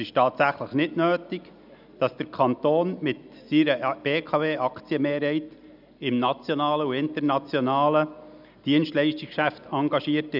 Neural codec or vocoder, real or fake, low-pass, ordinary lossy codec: none; real; 5.4 kHz; none